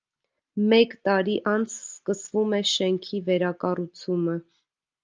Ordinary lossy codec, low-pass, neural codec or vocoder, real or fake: Opus, 32 kbps; 7.2 kHz; none; real